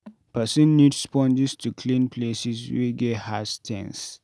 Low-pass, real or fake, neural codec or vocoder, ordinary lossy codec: none; real; none; none